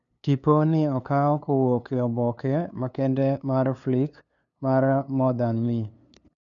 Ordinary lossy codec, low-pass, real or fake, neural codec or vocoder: none; 7.2 kHz; fake; codec, 16 kHz, 2 kbps, FunCodec, trained on LibriTTS, 25 frames a second